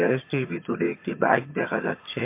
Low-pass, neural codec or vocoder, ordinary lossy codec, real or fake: 3.6 kHz; vocoder, 22.05 kHz, 80 mel bands, HiFi-GAN; MP3, 24 kbps; fake